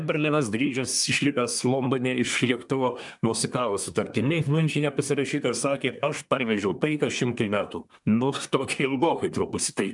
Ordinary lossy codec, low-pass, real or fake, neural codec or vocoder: MP3, 96 kbps; 10.8 kHz; fake; codec, 24 kHz, 1 kbps, SNAC